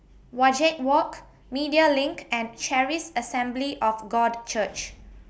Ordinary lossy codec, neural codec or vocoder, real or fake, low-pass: none; none; real; none